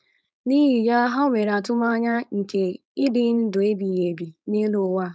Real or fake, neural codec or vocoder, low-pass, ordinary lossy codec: fake; codec, 16 kHz, 4.8 kbps, FACodec; none; none